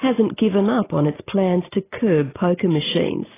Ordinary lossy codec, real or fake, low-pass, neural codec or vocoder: AAC, 16 kbps; real; 3.6 kHz; none